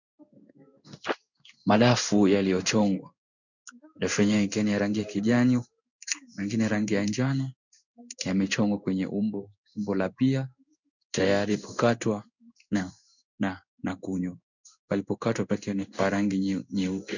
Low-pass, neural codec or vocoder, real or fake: 7.2 kHz; codec, 16 kHz in and 24 kHz out, 1 kbps, XY-Tokenizer; fake